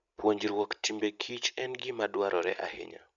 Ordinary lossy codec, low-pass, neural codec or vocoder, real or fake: MP3, 96 kbps; 7.2 kHz; none; real